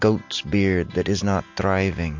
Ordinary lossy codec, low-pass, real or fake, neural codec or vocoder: MP3, 48 kbps; 7.2 kHz; real; none